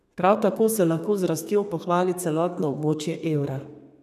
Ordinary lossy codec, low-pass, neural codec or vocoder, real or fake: none; 14.4 kHz; codec, 32 kHz, 1.9 kbps, SNAC; fake